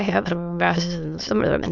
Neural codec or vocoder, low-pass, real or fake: autoencoder, 22.05 kHz, a latent of 192 numbers a frame, VITS, trained on many speakers; 7.2 kHz; fake